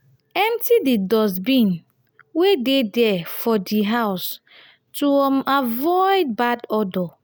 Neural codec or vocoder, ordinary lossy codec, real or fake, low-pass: none; none; real; none